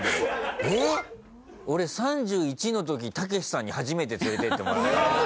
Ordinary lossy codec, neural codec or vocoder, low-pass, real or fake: none; none; none; real